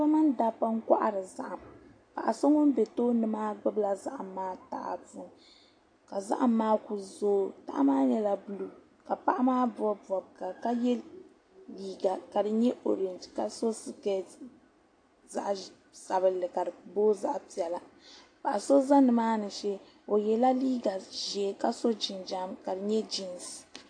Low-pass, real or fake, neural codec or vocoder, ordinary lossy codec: 9.9 kHz; real; none; AAC, 48 kbps